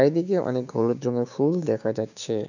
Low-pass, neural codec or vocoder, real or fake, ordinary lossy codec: 7.2 kHz; codec, 16 kHz, 6 kbps, DAC; fake; none